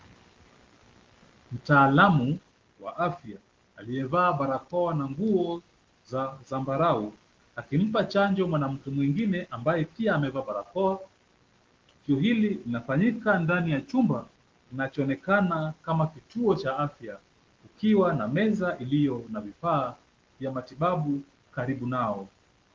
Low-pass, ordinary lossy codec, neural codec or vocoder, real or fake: 7.2 kHz; Opus, 16 kbps; none; real